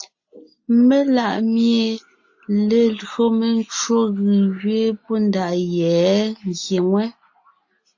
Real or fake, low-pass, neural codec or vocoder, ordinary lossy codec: real; 7.2 kHz; none; AAC, 48 kbps